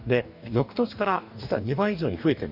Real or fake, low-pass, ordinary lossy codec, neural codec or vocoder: fake; 5.4 kHz; none; codec, 44.1 kHz, 2.6 kbps, DAC